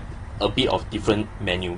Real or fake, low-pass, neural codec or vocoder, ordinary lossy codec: real; 10.8 kHz; none; AAC, 32 kbps